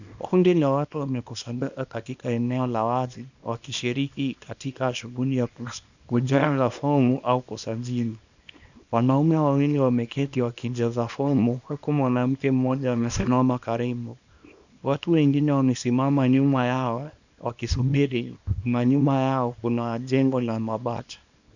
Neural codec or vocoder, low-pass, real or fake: codec, 24 kHz, 0.9 kbps, WavTokenizer, small release; 7.2 kHz; fake